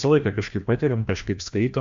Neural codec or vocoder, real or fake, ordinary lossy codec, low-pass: codec, 16 kHz, 1 kbps, FreqCodec, larger model; fake; MP3, 64 kbps; 7.2 kHz